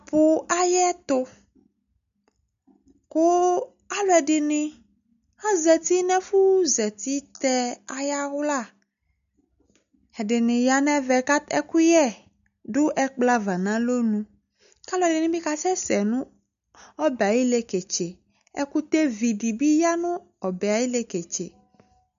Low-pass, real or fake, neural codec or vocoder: 7.2 kHz; real; none